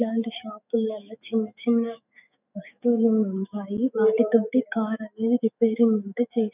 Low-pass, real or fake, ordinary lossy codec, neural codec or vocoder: 3.6 kHz; fake; none; autoencoder, 48 kHz, 128 numbers a frame, DAC-VAE, trained on Japanese speech